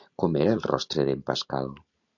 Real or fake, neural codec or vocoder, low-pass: real; none; 7.2 kHz